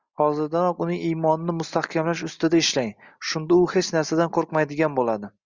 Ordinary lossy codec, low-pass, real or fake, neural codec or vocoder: Opus, 64 kbps; 7.2 kHz; real; none